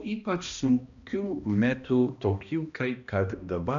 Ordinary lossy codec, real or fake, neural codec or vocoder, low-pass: MP3, 96 kbps; fake; codec, 16 kHz, 1 kbps, X-Codec, HuBERT features, trained on balanced general audio; 7.2 kHz